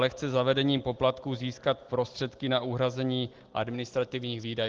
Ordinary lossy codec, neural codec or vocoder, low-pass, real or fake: Opus, 16 kbps; none; 7.2 kHz; real